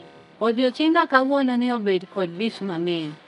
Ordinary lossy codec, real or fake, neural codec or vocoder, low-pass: none; fake; codec, 24 kHz, 0.9 kbps, WavTokenizer, medium music audio release; 10.8 kHz